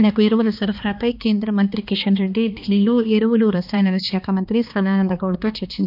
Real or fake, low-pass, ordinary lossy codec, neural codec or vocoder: fake; 5.4 kHz; none; codec, 16 kHz, 2 kbps, X-Codec, HuBERT features, trained on balanced general audio